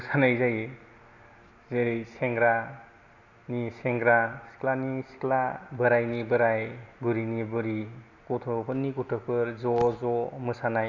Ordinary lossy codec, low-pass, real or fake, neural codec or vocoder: none; 7.2 kHz; real; none